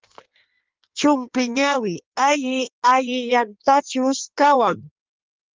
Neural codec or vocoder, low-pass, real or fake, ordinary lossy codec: codec, 16 kHz in and 24 kHz out, 1.1 kbps, FireRedTTS-2 codec; 7.2 kHz; fake; Opus, 24 kbps